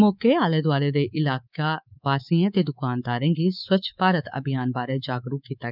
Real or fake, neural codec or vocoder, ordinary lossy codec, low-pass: fake; codec, 24 kHz, 3.1 kbps, DualCodec; none; 5.4 kHz